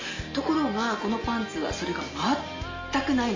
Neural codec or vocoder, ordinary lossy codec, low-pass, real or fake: none; MP3, 32 kbps; 7.2 kHz; real